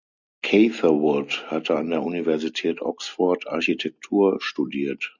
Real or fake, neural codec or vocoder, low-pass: real; none; 7.2 kHz